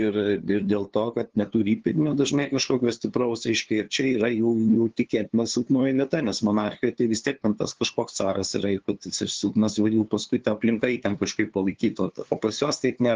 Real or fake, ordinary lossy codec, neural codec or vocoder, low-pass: fake; Opus, 16 kbps; codec, 16 kHz, 2 kbps, FunCodec, trained on LibriTTS, 25 frames a second; 7.2 kHz